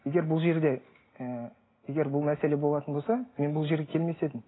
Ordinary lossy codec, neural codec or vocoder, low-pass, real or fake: AAC, 16 kbps; none; 7.2 kHz; real